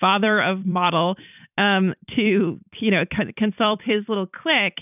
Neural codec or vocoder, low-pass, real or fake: vocoder, 44.1 kHz, 128 mel bands every 256 samples, BigVGAN v2; 3.6 kHz; fake